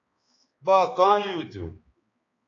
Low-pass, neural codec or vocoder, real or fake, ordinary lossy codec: 7.2 kHz; codec, 16 kHz, 2 kbps, X-Codec, HuBERT features, trained on balanced general audio; fake; AAC, 32 kbps